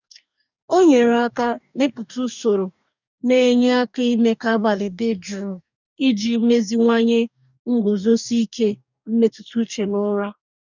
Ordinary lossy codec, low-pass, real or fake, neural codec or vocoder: none; 7.2 kHz; fake; codec, 44.1 kHz, 2.6 kbps, DAC